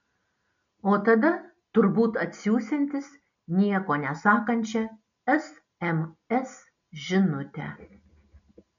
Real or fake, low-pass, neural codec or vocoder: real; 7.2 kHz; none